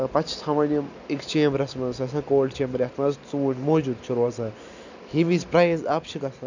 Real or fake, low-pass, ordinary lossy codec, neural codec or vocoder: real; 7.2 kHz; none; none